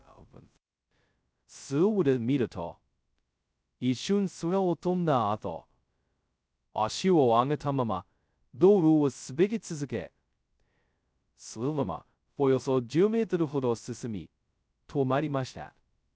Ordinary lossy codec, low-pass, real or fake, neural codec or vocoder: none; none; fake; codec, 16 kHz, 0.2 kbps, FocalCodec